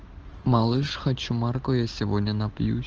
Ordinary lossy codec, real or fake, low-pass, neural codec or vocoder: Opus, 16 kbps; real; 7.2 kHz; none